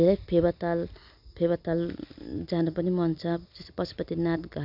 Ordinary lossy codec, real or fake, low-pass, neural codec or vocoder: none; real; 5.4 kHz; none